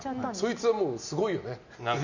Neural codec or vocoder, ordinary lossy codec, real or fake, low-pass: none; none; real; 7.2 kHz